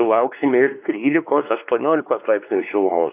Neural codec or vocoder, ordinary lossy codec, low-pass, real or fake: codec, 16 kHz, 2 kbps, X-Codec, WavLM features, trained on Multilingual LibriSpeech; AAC, 32 kbps; 3.6 kHz; fake